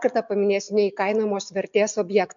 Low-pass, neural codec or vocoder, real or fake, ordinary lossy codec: 7.2 kHz; none; real; MP3, 64 kbps